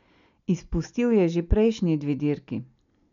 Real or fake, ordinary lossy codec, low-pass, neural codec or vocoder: real; none; 7.2 kHz; none